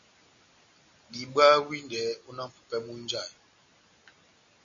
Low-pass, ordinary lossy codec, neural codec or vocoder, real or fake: 7.2 kHz; MP3, 64 kbps; none; real